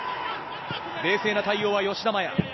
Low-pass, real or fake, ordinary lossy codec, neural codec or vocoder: 7.2 kHz; real; MP3, 24 kbps; none